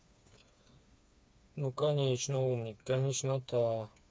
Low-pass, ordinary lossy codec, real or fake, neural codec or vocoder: none; none; fake; codec, 16 kHz, 4 kbps, FreqCodec, smaller model